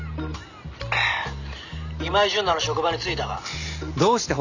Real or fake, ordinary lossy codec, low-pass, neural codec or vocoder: real; none; 7.2 kHz; none